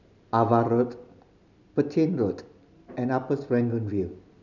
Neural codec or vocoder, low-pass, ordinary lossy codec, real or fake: none; 7.2 kHz; none; real